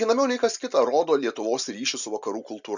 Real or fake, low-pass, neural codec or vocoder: real; 7.2 kHz; none